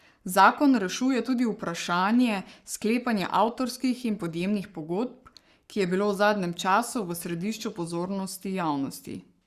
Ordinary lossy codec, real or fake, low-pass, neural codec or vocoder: Opus, 64 kbps; fake; 14.4 kHz; codec, 44.1 kHz, 7.8 kbps, Pupu-Codec